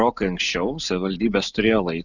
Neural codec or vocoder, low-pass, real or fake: none; 7.2 kHz; real